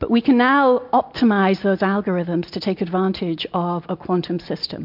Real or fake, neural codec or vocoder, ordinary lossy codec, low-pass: real; none; MP3, 48 kbps; 5.4 kHz